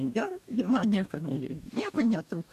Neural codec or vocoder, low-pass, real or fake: codec, 44.1 kHz, 2.6 kbps, DAC; 14.4 kHz; fake